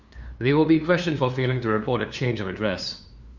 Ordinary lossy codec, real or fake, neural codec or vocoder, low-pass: Opus, 64 kbps; fake; codec, 16 kHz, 2 kbps, FunCodec, trained on LibriTTS, 25 frames a second; 7.2 kHz